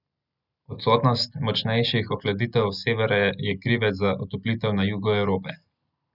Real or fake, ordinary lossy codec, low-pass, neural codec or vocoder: real; none; 5.4 kHz; none